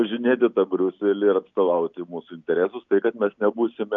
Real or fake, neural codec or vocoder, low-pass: real; none; 7.2 kHz